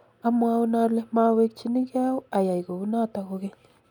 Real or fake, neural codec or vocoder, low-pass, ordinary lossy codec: real; none; 19.8 kHz; none